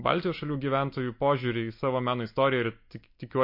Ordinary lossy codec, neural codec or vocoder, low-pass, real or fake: MP3, 32 kbps; none; 5.4 kHz; real